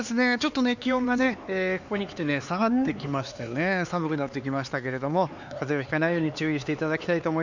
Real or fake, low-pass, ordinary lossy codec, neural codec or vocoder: fake; 7.2 kHz; Opus, 64 kbps; codec, 16 kHz, 4 kbps, X-Codec, HuBERT features, trained on LibriSpeech